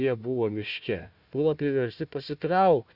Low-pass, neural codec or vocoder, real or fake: 5.4 kHz; codec, 16 kHz, 1 kbps, FunCodec, trained on Chinese and English, 50 frames a second; fake